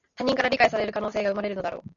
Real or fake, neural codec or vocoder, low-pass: real; none; 7.2 kHz